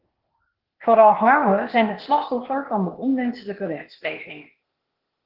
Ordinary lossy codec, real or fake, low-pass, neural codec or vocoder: Opus, 16 kbps; fake; 5.4 kHz; codec, 16 kHz, 0.8 kbps, ZipCodec